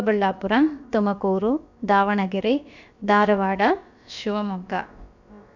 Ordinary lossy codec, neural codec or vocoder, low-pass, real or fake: none; codec, 16 kHz, about 1 kbps, DyCAST, with the encoder's durations; 7.2 kHz; fake